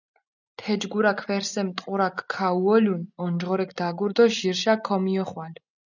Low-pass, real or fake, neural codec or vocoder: 7.2 kHz; real; none